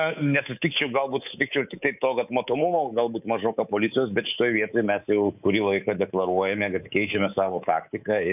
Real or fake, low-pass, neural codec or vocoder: fake; 3.6 kHz; codec, 24 kHz, 3.1 kbps, DualCodec